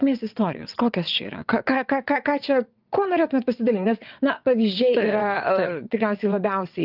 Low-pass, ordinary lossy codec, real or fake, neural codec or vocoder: 5.4 kHz; Opus, 32 kbps; fake; vocoder, 22.05 kHz, 80 mel bands, WaveNeXt